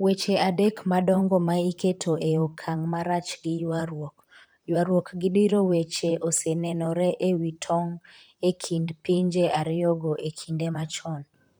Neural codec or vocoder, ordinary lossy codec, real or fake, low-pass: vocoder, 44.1 kHz, 128 mel bands, Pupu-Vocoder; none; fake; none